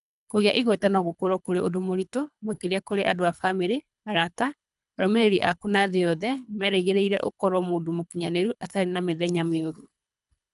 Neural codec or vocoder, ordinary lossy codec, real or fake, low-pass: codec, 24 kHz, 3 kbps, HILCodec; none; fake; 10.8 kHz